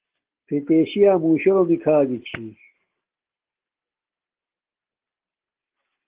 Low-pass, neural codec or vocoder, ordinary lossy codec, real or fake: 3.6 kHz; none; Opus, 16 kbps; real